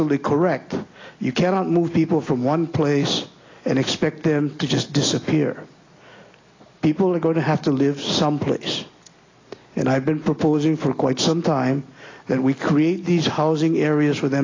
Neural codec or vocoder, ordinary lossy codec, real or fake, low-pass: none; AAC, 32 kbps; real; 7.2 kHz